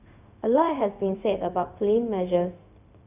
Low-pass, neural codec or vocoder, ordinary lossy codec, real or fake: 3.6 kHz; codec, 16 kHz, 0.4 kbps, LongCat-Audio-Codec; none; fake